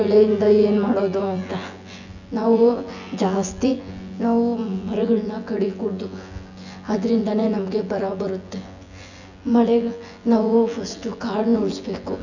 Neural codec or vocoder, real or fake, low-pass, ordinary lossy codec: vocoder, 24 kHz, 100 mel bands, Vocos; fake; 7.2 kHz; none